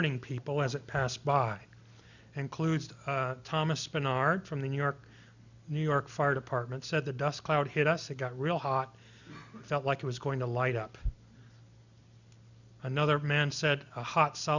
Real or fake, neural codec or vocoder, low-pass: real; none; 7.2 kHz